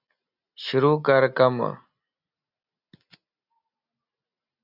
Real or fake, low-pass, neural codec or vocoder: real; 5.4 kHz; none